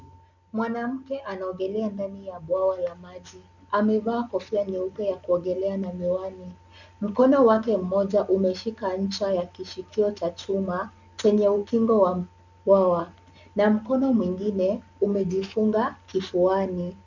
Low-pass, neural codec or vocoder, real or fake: 7.2 kHz; none; real